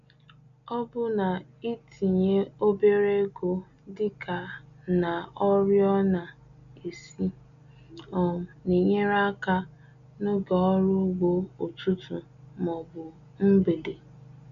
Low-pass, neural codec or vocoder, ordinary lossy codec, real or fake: 7.2 kHz; none; none; real